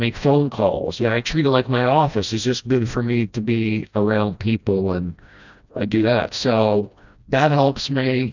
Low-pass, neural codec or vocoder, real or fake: 7.2 kHz; codec, 16 kHz, 1 kbps, FreqCodec, smaller model; fake